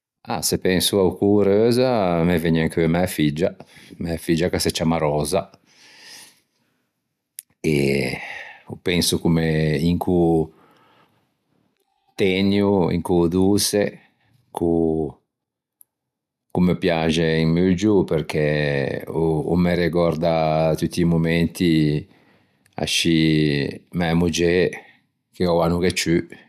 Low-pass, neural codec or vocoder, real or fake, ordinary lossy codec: 14.4 kHz; none; real; none